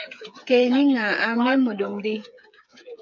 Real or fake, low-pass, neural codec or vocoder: fake; 7.2 kHz; codec, 16 kHz, 8 kbps, FreqCodec, smaller model